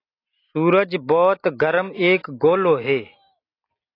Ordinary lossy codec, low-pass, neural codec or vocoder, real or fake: AAC, 32 kbps; 5.4 kHz; none; real